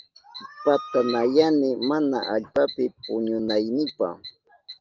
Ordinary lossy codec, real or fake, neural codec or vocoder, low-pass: Opus, 32 kbps; real; none; 7.2 kHz